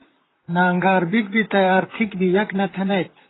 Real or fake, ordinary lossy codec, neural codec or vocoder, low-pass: fake; AAC, 16 kbps; vocoder, 44.1 kHz, 128 mel bands, Pupu-Vocoder; 7.2 kHz